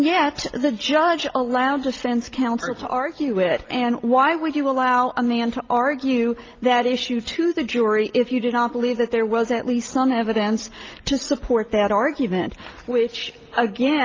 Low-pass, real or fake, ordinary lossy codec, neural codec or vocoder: 7.2 kHz; real; Opus, 32 kbps; none